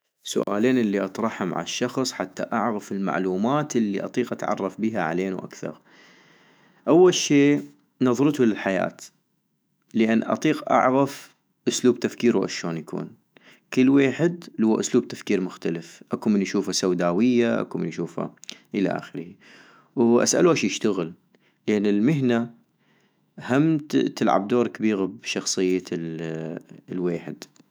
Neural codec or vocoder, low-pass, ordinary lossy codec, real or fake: autoencoder, 48 kHz, 128 numbers a frame, DAC-VAE, trained on Japanese speech; none; none; fake